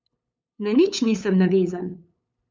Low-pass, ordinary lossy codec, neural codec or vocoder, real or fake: none; none; codec, 16 kHz, 8 kbps, FunCodec, trained on LibriTTS, 25 frames a second; fake